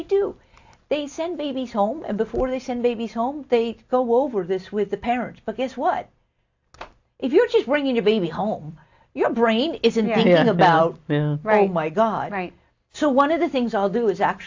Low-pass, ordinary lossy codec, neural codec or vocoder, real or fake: 7.2 kHz; AAC, 48 kbps; none; real